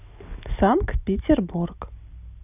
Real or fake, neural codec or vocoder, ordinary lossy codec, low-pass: real; none; none; 3.6 kHz